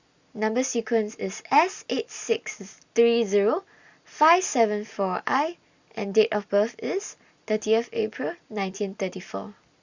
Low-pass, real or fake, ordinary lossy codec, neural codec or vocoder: 7.2 kHz; real; Opus, 64 kbps; none